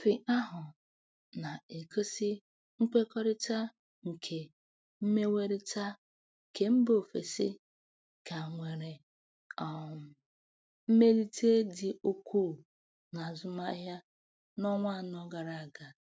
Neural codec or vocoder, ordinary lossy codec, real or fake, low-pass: none; none; real; none